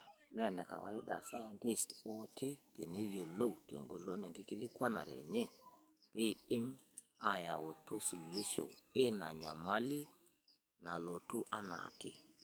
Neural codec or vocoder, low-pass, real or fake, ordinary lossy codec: codec, 44.1 kHz, 2.6 kbps, SNAC; none; fake; none